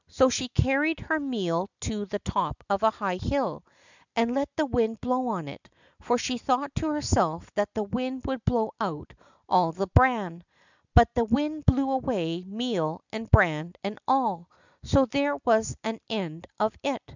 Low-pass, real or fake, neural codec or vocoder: 7.2 kHz; real; none